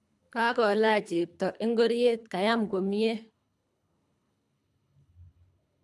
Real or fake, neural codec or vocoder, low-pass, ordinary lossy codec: fake; codec, 24 kHz, 3 kbps, HILCodec; none; none